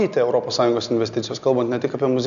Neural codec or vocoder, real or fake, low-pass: none; real; 7.2 kHz